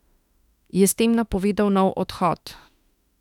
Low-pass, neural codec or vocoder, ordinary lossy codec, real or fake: 19.8 kHz; autoencoder, 48 kHz, 32 numbers a frame, DAC-VAE, trained on Japanese speech; none; fake